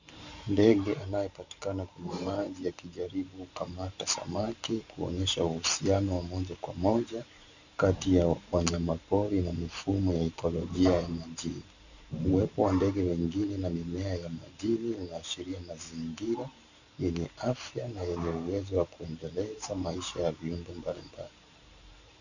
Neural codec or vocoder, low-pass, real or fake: vocoder, 44.1 kHz, 128 mel bands every 512 samples, BigVGAN v2; 7.2 kHz; fake